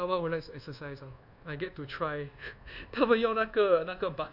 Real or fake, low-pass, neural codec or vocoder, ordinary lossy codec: fake; 5.4 kHz; codec, 24 kHz, 1.2 kbps, DualCodec; none